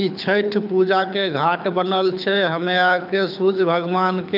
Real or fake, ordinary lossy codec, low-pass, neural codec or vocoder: fake; none; 5.4 kHz; codec, 24 kHz, 6 kbps, HILCodec